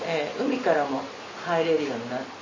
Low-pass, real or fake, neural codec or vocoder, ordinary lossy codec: 7.2 kHz; real; none; MP3, 32 kbps